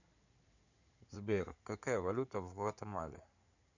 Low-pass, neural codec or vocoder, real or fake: 7.2 kHz; codec, 16 kHz in and 24 kHz out, 2.2 kbps, FireRedTTS-2 codec; fake